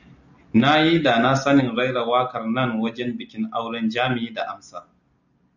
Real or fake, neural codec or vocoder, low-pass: real; none; 7.2 kHz